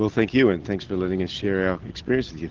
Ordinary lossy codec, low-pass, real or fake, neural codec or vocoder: Opus, 16 kbps; 7.2 kHz; real; none